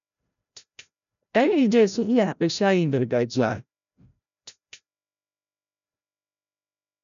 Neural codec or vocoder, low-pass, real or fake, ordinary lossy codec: codec, 16 kHz, 0.5 kbps, FreqCodec, larger model; 7.2 kHz; fake; MP3, 96 kbps